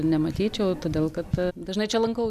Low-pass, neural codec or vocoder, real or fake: 14.4 kHz; none; real